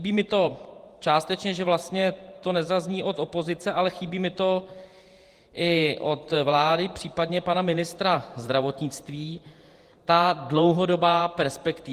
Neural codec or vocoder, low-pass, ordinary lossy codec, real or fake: vocoder, 48 kHz, 128 mel bands, Vocos; 14.4 kHz; Opus, 24 kbps; fake